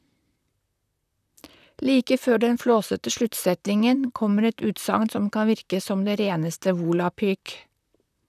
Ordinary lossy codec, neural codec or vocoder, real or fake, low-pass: none; vocoder, 44.1 kHz, 128 mel bands, Pupu-Vocoder; fake; 14.4 kHz